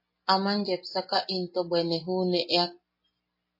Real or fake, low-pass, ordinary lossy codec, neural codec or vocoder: real; 5.4 kHz; MP3, 24 kbps; none